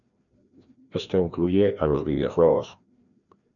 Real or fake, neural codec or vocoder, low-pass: fake; codec, 16 kHz, 1 kbps, FreqCodec, larger model; 7.2 kHz